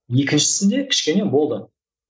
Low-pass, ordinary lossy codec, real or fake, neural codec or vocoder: none; none; real; none